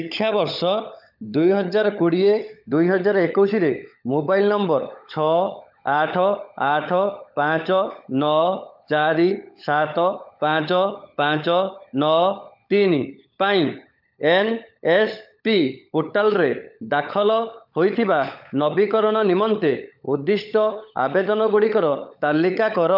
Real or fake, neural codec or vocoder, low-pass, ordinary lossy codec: fake; codec, 16 kHz, 16 kbps, FunCodec, trained on LibriTTS, 50 frames a second; 5.4 kHz; none